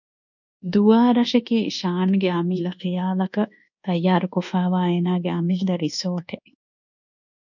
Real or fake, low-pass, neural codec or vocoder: fake; 7.2 kHz; codec, 24 kHz, 1.2 kbps, DualCodec